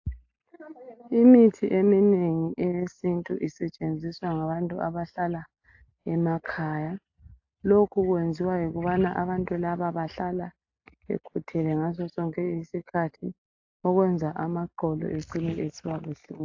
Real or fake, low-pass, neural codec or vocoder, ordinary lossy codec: real; 7.2 kHz; none; AAC, 48 kbps